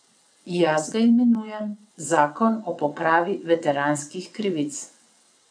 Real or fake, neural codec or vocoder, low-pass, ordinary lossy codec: fake; vocoder, 22.05 kHz, 80 mel bands, WaveNeXt; 9.9 kHz; none